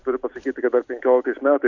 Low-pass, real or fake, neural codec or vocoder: 7.2 kHz; real; none